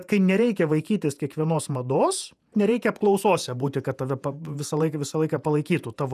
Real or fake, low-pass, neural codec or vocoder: fake; 14.4 kHz; vocoder, 48 kHz, 128 mel bands, Vocos